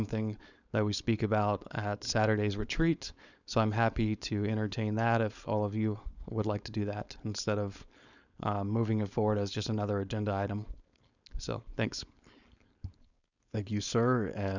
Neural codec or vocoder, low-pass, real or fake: codec, 16 kHz, 4.8 kbps, FACodec; 7.2 kHz; fake